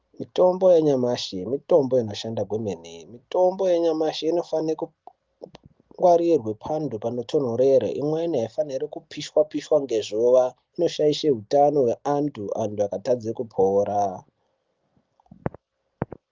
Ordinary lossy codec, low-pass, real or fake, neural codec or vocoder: Opus, 32 kbps; 7.2 kHz; real; none